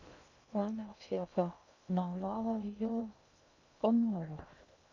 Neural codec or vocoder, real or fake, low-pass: codec, 16 kHz in and 24 kHz out, 0.6 kbps, FocalCodec, streaming, 2048 codes; fake; 7.2 kHz